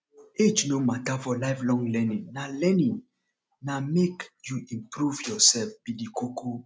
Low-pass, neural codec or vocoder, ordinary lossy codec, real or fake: none; none; none; real